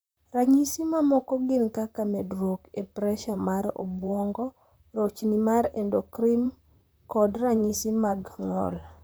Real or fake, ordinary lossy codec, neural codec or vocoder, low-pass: fake; none; vocoder, 44.1 kHz, 128 mel bands, Pupu-Vocoder; none